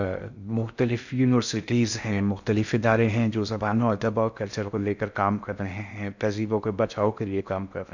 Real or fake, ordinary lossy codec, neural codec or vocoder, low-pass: fake; none; codec, 16 kHz in and 24 kHz out, 0.6 kbps, FocalCodec, streaming, 2048 codes; 7.2 kHz